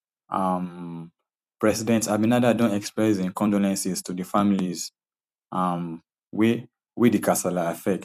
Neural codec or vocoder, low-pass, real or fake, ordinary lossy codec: vocoder, 44.1 kHz, 128 mel bands every 256 samples, BigVGAN v2; 14.4 kHz; fake; none